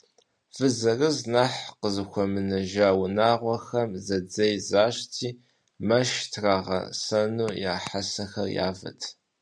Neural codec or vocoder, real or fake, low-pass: none; real; 9.9 kHz